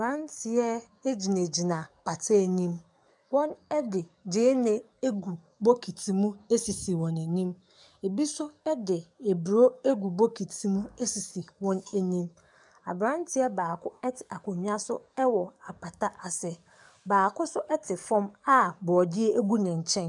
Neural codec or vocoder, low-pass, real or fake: codec, 44.1 kHz, 7.8 kbps, DAC; 10.8 kHz; fake